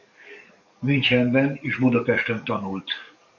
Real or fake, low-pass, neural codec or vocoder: fake; 7.2 kHz; codec, 44.1 kHz, 7.8 kbps, DAC